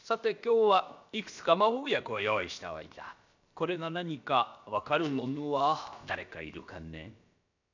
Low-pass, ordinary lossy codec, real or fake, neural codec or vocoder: 7.2 kHz; none; fake; codec, 16 kHz, about 1 kbps, DyCAST, with the encoder's durations